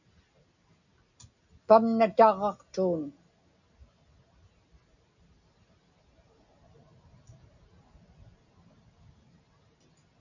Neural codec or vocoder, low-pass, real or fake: none; 7.2 kHz; real